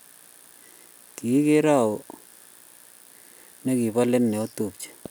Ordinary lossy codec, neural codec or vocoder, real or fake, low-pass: none; none; real; none